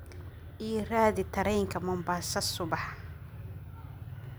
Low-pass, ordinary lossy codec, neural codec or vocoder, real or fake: none; none; none; real